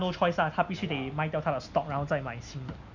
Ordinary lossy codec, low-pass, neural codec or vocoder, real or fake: none; 7.2 kHz; none; real